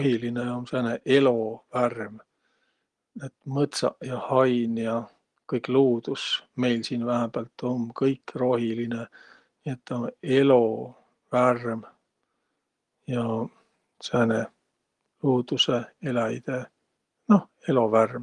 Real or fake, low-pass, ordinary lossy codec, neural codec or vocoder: real; 10.8 kHz; Opus, 24 kbps; none